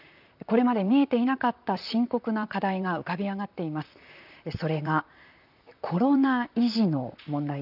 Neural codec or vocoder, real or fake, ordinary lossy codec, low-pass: vocoder, 44.1 kHz, 128 mel bands, Pupu-Vocoder; fake; none; 5.4 kHz